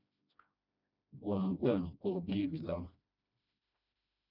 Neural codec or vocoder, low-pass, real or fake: codec, 16 kHz, 1 kbps, FreqCodec, smaller model; 5.4 kHz; fake